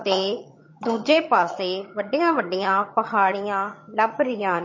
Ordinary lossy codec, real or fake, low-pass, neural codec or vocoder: MP3, 32 kbps; fake; 7.2 kHz; vocoder, 22.05 kHz, 80 mel bands, HiFi-GAN